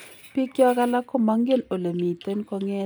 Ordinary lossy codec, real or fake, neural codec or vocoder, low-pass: none; real; none; none